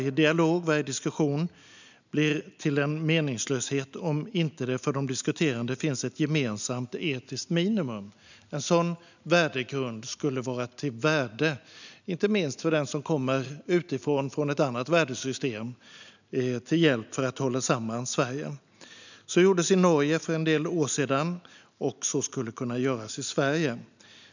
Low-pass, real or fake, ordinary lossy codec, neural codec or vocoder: 7.2 kHz; real; none; none